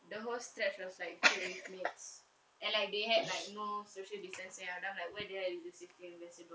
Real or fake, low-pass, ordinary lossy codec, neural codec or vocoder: real; none; none; none